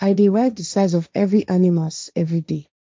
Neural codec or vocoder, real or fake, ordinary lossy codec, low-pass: codec, 16 kHz, 1.1 kbps, Voila-Tokenizer; fake; none; none